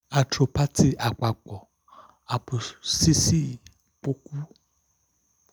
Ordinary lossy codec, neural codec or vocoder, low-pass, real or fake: none; none; none; real